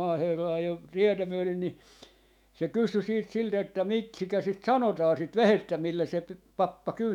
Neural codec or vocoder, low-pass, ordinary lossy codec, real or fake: autoencoder, 48 kHz, 128 numbers a frame, DAC-VAE, trained on Japanese speech; 19.8 kHz; none; fake